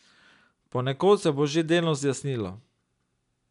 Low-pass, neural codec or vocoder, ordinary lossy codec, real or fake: 10.8 kHz; none; none; real